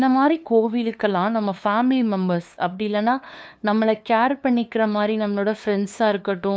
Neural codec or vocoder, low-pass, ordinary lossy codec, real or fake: codec, 16 kHz, 2 kbps, FunCodec, trained on LibriTTS, 25 frames a second; none; none; fake